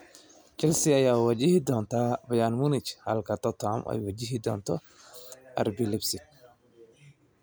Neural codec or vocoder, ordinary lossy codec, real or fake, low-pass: none; none; real; none